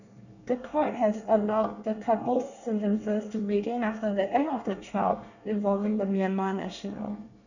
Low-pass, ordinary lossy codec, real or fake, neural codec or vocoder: 7.2 kHz; none; fake; codec, 24 kHz, 1 kbps, SNAC